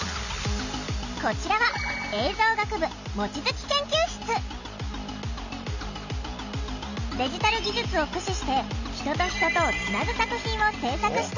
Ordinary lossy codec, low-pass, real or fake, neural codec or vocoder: none; 7.2 kHz; real; none